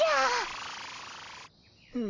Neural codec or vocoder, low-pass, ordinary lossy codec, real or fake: none; 7.2 kHz; Opus, 32 kbps; real